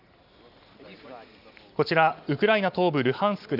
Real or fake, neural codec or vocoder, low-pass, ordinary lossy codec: real; none; 5.4 kHz; none